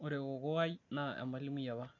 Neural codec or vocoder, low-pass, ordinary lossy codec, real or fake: none; 7.2 kHz; MP3, 48 kbps; real